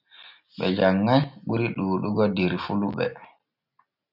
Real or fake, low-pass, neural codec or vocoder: real; 5.4 kHz; none